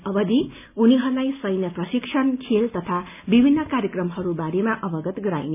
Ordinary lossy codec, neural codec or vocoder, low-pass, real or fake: none; none; 3.6 kHz; real